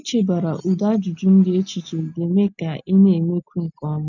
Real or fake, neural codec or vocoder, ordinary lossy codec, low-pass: real; none; none; 7.2 kHz